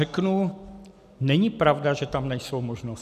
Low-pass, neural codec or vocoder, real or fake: 14.4 kHz; none; real